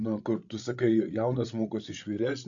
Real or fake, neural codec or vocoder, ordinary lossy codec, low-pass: fake; codec, 16 kHz, 16 kbps, FreqCodec, larger model; MP3, 96 kbps; 7.2 kHz